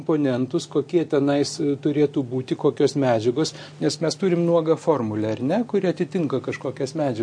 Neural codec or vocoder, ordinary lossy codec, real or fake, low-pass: none; MP3, 48 kbps; real; 9.9 kHz